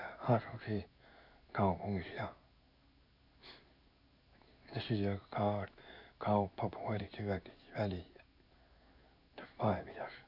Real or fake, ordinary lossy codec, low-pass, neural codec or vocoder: fake; none; 5.4 kHz; codec, 16 kHz in and 24 kHz out, 1 kbps, XY-Tokenizer